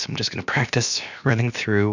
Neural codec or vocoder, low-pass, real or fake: codec, 16 kHz, about 1 kbps, DyCAST, with the encoder's durations; 7.2 kHz; fake